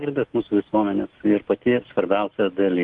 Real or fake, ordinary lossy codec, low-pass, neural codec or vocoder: real; Opus, 32 kbps; 10.8 kHz; none